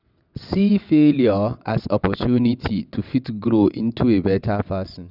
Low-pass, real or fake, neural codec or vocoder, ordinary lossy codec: 5.4 kHz; fake; vocoder, 22.05 kHz, 80 mel bands, WaveNeXt; none